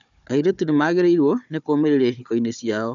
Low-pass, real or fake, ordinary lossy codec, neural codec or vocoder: 7.2 kHz; fake; none; codec, 16 kHz, 4 kbps, FunCodec, trained on Chinese and English, 50 frames a second